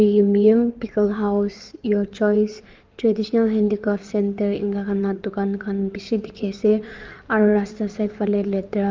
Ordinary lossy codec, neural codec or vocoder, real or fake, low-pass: Opus, 32 kbps; codec, 16 kHz in and 24 kHz out, 2.2 kbps, FireRedTTS-2 codec; fake; 7.2 kHz